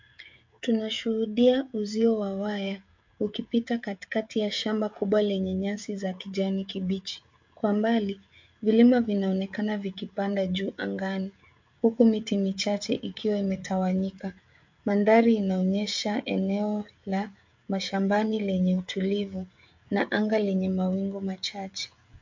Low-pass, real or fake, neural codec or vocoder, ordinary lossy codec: 7.2 kHz; fake; codec, 16 kHz, 16 kbps, FreqCodec, smaller model; MP3, 48 kbps